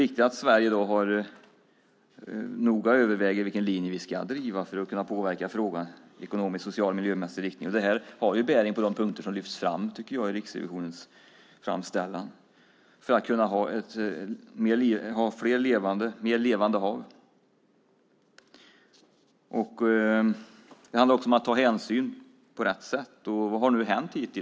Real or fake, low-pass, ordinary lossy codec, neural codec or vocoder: real; none; none; none